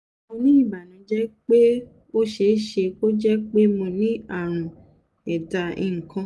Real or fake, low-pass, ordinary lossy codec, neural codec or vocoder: real; none; none; none